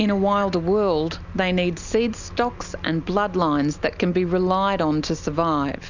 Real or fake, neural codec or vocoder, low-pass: real; none; 7.2 kHz